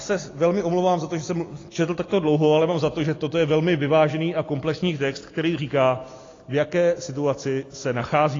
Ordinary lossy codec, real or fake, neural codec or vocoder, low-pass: AAC, 32 kbps; real; none; 7.2 kHz